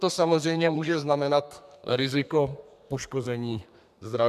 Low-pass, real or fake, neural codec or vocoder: 14.4 kHz; fake; codec, 32 kHz, 1.9 kbps, SNAC